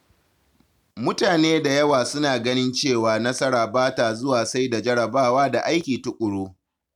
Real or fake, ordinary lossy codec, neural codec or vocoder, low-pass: real; none; none; 19.8 kHz